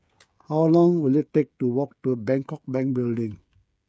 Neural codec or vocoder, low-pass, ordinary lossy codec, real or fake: codec, 16 kHz, 16 kbps, FreqCodec, smaller model; none; none; fake